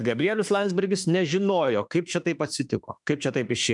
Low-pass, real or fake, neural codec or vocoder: 10.8 kHz; fake; autoencoder, 48 kHz, 32 numbers a frame, DAC-VAE, trained on Japanese speech